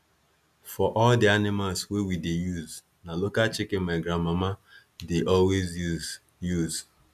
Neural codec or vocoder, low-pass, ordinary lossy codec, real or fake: none; 14.4 kHz; none; real